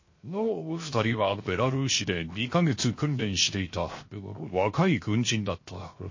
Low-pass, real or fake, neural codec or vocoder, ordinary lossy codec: 7.2 kHz; fake; codec, 16 kHz, 0.7 kbps, FocalCodec; MP3, 32 kbps